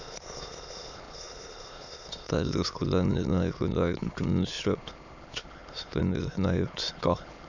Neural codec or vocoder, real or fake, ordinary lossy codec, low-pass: autoencoder, 22.05 kHz, a latent of 192 numbers a frame, VITS, trained on many speakers; fake; none; 7.2 kHz